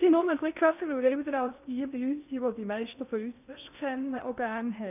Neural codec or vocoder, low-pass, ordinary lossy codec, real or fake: codec, 16 kHz in and 24 kHz out, 0.8 kbps, FocalCodec, streaming, 65536 codes; 3.6 kHz; none; fake